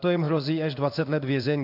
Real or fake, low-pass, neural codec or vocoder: fake; 5.4 kHz; codec, 16 kHz in and 24 kHz out, 1 kbps, XY-Tokenizer